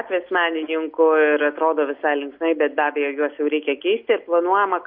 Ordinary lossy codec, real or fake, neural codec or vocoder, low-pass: MP3, 48 kbps; real; none; 5.4 kHz